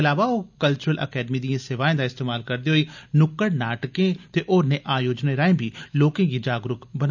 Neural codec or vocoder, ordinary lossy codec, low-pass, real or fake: none; none; 7.2 kHz; real